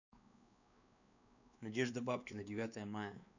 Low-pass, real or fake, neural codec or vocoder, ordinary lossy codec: 7.2 kHz; fake; codec, 16 kHz, 2 kbps, X-Codec, WavLM features, trained on Multilingual LibriSpeech; none